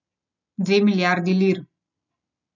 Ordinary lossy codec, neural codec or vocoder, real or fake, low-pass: none; none; real; 7.2 kHz